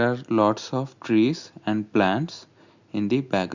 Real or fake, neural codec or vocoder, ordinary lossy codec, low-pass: real; none; Opus, 64 kbps; 7.2 kHz